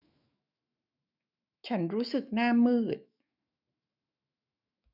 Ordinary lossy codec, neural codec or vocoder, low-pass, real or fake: none; autoencoder, 48 kHz, 128 numbers a frame, DAC-VAE, trained on Japanese speech; 5.4 kHz; fake